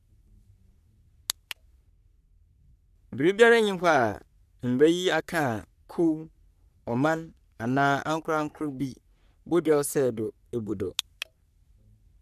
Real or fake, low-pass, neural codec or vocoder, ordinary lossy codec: fake; 14.4 kHz; codec, 44.1 kHz, 3.4 kbps, Pupu-Codec; none